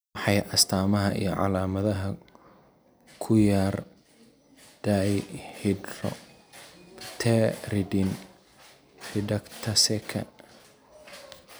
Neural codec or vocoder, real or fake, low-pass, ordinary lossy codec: none; real; none; none